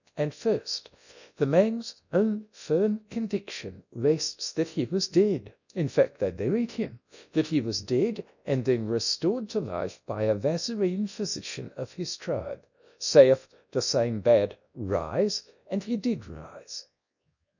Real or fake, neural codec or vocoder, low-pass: fake; codec, 24 kHz, 0.9 kbps, WavTokenizer, large speech release; 7.2 kHz